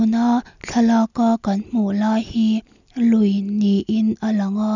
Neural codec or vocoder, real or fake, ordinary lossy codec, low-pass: none; real; none; 7.2 kHz